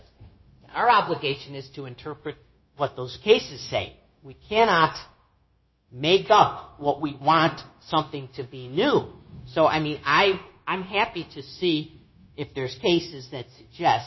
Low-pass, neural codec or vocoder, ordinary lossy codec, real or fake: 7.2 kHz; codec, 16 kHz, 0.9 kbps, LongCat-Audio-Codec; MP3, 24 kbps; fake